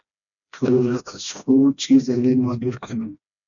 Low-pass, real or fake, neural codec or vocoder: 7.2 kHz; fake; codec, 16 kHz, 1 kbps, FreqCodec, smaller model